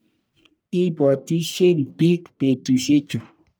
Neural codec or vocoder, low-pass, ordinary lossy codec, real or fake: codec, 44.1 kHz, 1.7 kbps, Pupu-Codec; none; none; fake